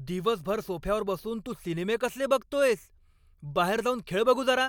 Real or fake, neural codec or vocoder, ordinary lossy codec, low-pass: real; none; MP3, 96 kbps; 19.8 kHz